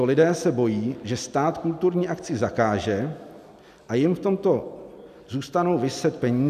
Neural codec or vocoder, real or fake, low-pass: none; real; 14.4 kHz